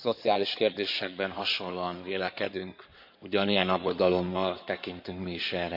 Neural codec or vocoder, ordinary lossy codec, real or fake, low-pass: codec, 16 kHz in and 24 kHz out, 2.2 kbps, FireRedTTS-2 codec; MP3, 48 kbps; fake; 5.4 kHz